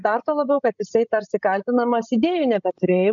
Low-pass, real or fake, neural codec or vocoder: 7.2 kHz; fake; codec, 16 kHz, 16 kbps, FreqCodec, larger model